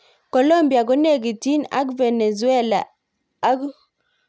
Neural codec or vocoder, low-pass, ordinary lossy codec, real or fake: none; none; none; real